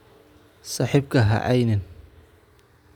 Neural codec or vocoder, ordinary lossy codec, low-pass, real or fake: none; none; 19.8 kHz; real